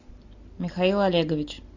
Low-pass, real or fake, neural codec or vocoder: 7.2 kHz; real; none